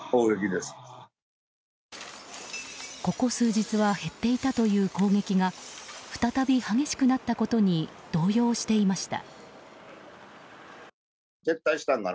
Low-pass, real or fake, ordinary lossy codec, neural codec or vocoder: none; real; none; none